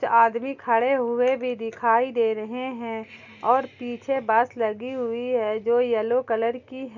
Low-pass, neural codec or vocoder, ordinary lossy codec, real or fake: 7.2 kHz; none; none; real